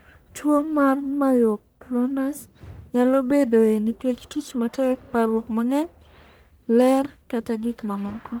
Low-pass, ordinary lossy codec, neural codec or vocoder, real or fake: none; none; codec, 44.1 kHz, 1.7 kbps, Pupu-Codec; fake